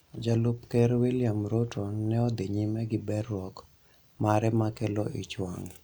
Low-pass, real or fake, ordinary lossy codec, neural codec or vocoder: none; real; none; none